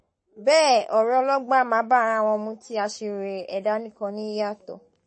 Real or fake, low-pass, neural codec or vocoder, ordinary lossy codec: fake; 9.9 kHz; codec, 44.1 kHz, 7.8 kbps, Pupu-Codec; MP3, 32 kbps